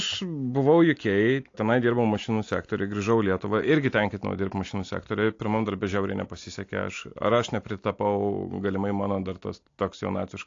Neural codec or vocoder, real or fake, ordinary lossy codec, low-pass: none; real; AAC, 48 kbps; 7.2 kHz